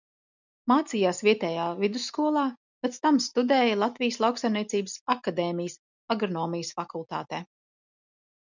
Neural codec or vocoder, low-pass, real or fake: none; 7.2 kHz; real